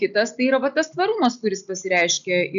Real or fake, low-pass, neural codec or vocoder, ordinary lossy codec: real; 7.2 kHz; none; MP3, 96 kbps